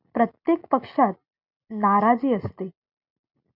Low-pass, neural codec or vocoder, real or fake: 5.4 kHz; none; real